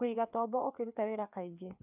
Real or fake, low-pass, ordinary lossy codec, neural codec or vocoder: fake; 3.6 kHz; none; codec, 16 kHz, 2 kbps, FreqCodec, larger model